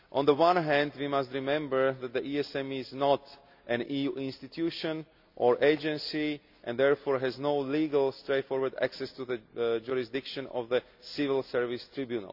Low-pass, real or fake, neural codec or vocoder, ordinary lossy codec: 5.4 kHz; real; none; none